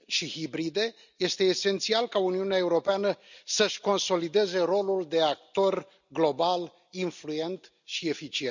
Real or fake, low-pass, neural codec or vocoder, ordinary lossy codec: real; 7.2 kHz; none; none